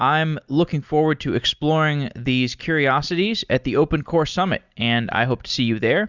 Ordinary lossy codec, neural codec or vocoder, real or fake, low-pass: Opus, 64 kbps; none; real; 7.2 kHz